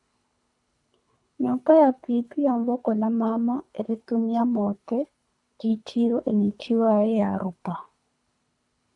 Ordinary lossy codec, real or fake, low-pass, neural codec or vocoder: AAC, 64 kbps; fake; 10.8 kHz; codec, 24 kHz, 3 kbps, HILCodec